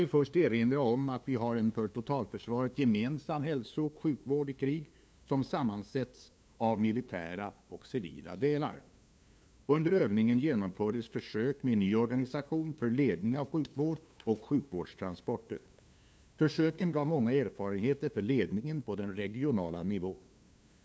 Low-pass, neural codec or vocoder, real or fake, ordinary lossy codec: none; codec, 16 kHz, 2 kbps, FunCodec, trained on LibriTTS, 25 frames a second; fake; none